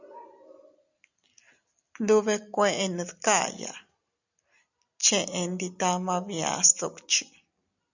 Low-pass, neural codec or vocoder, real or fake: 7.2 kHz; none; real